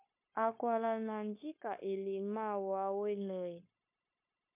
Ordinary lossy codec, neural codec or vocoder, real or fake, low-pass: MP3, 24 kbps; codec, 16 kHz, 0.9 kbps, LongCat-Audio-Codec; fake; 3.6 kHz